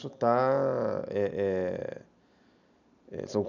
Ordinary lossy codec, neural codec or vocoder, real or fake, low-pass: none; none; real; 7.2 kHz